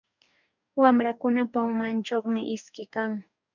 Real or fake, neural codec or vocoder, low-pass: fake; codec, 44.1 kHz, 2.6 kbps, DAC; 7.2 kHz